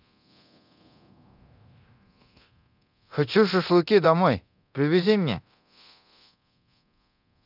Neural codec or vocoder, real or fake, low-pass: codec, 24 kHz, 0.9 kbps, DualCodec; fake; 5.4 kHz